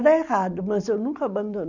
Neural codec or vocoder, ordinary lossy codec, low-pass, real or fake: none; none; 7.2 kHz; real